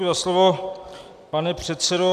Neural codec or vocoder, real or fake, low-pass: none; real; 14.4 kHz